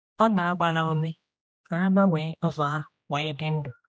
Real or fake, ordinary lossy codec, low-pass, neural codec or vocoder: fake; none; none; codec, 16 kHz, 1 kbps, X-Codec, HuBERT features, trained on general audio